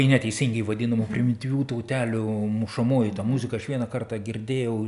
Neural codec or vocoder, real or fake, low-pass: none; real; 10.8 kHz